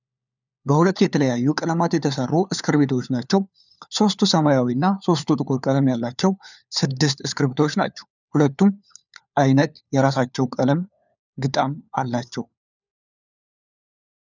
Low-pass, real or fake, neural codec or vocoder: 7.2 kHz; fake; codec, 16 kHz, 4 kbps, FunCodec, trained on LibriTTS, 50 frames a second